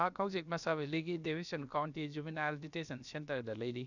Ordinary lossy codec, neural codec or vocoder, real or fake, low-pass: none; codec, 16 kHz, about 1 kbps, DyCAST, with the encoder's durations; fake; 7.2 kHz